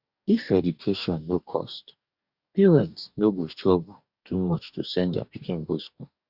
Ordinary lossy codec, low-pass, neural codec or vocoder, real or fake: Opus, 64 kbps; 5.4 kHz; codec, 44.1 kHz, 2.6 kbps, DAC; fake